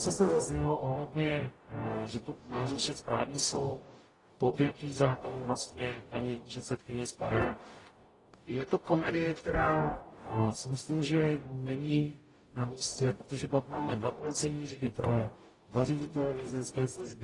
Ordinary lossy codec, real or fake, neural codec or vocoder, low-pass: AAC, 32 kbps; fake; codec, 44.1 kHz, 0.9 kbps, DAC; 10.8 kHz